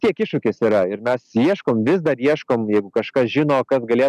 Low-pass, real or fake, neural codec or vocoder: 14.4 kHz; real; none